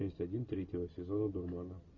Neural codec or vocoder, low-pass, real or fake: none; 7.2 kHz; real